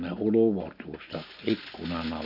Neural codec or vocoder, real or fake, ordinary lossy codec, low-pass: none; real; none; 5.4 kHz